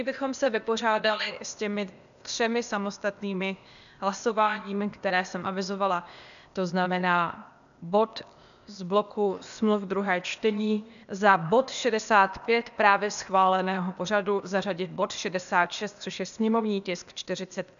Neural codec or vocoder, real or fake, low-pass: codec, 16 kHz, 0.8 kbps, ZipCodec; fake; 7.2 kHz